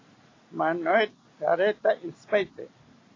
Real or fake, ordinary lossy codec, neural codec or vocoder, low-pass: real; AAC, 32 kbps; none; 7.2 kHz